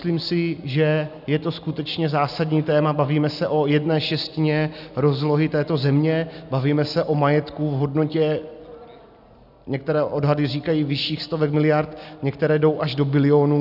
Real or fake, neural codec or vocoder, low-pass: real; none; 5.4 kHz